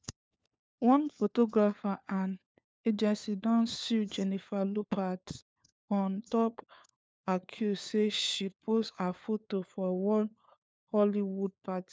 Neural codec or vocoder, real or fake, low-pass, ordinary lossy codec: codec, 16 kHz, 4 kbps, FunCodec, trained on LibriTTS, 50 frames a second; fake; none; none